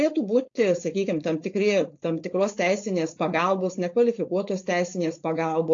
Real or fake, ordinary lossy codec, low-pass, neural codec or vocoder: fake; AAC, 48 kbps; 7.2 kHz; codec, 16 kHz, 4.8 kbps, FACodec